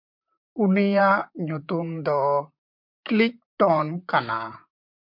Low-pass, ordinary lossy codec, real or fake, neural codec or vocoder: 5.4 kHz; MP3, 48 kbps; fake; vocoder, 44.1 kHz, 128 mel bands, Pupu-Vocoder